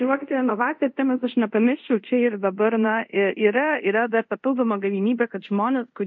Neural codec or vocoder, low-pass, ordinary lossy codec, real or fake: codec, 24 kHz, 0.5 kbps, DualCodec; 7.2 kHz; MP3, 48 kbps; fake